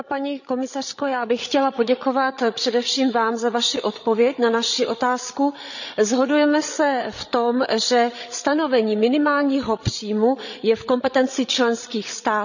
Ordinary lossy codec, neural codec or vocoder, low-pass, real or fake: none; codec, 16 kHz, 16 kbps, FreqCodec, larger model; 7.2 kHz; fake